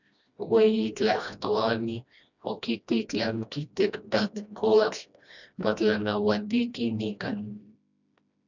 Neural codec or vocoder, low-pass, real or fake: codec, 16 kHz, 1 kbps, FreqCodec, smaller model; 7.2 kHz; fake